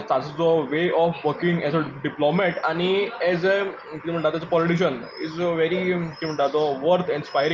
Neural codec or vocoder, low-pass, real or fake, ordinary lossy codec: none; 7.2 kHz; real; Opus, 24 kbps